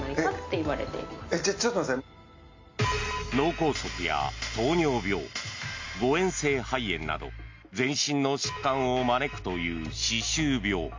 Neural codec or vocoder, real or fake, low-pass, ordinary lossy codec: none; real; 7.2 kHz; MP3, 48 kbps